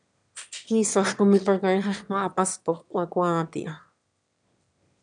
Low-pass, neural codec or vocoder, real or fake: 9.9 kHz; autoencoder, 22.05 kHz, a latent of 192 numbers a frame, VITS, trained on one speaker; fake